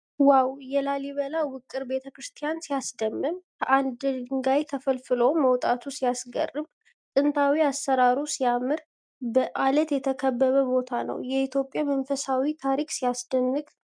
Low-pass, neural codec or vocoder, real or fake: 9.9 kHz; none; real